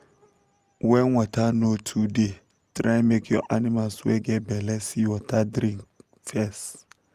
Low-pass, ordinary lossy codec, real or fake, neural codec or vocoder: 14.4 kHz; Opus, 32 kbps; real; none